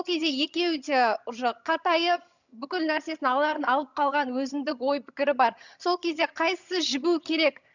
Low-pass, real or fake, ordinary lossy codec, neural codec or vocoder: 7.2 kHz; fake; none; vocoder, 22.05 kHz, 80 mel bands, HiFi-GAN